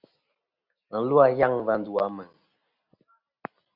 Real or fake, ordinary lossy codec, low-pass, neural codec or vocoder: real; Opus, 64 kbps; 5.4 kHz; none